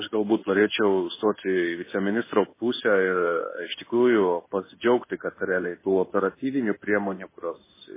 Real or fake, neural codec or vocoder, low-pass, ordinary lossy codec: fake; codec, 16 kHz in and 24 kHz out, 1 kbps, XY-Tokenizer; 3.6 kHz; MP3, 16 kbps